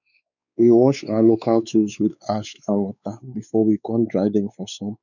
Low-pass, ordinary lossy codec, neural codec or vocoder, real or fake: 7.2 kHz; none; codec, 16 kHz, 4 kbps, X-Codec, WavLM features, trained on Multilingual LibriSpeech; fake